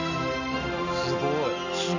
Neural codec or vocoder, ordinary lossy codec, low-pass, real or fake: none; none; 7.2 kHz; real